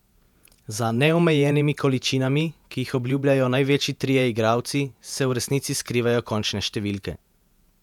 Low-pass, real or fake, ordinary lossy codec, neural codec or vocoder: 19.8 kHz; fake; none; vocoder, 48 kHz, 128 mel bands, Vocos